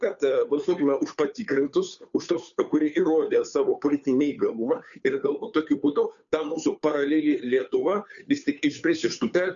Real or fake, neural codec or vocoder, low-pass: fake; codec, 16 kHz, 2 kbps, FunCodec, trained on Chinese and English, 25 frames a second; 7.2 kHz